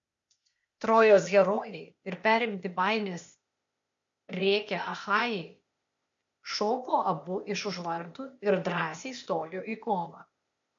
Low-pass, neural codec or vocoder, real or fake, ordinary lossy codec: 7.2 kHz; codec, 16 kHz, 0.8 kbps, ZipCodec; fake; MP3, 48 kbps